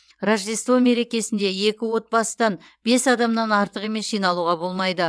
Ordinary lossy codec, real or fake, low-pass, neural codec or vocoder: none; fake; none; vocoder, 22.05 kHz, 80 mel bands, WaveNeXt